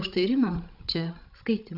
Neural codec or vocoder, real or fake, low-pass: codec, 16 kHz, 16 kbps, FreqCodec, larger model; fake; 5.4 kHz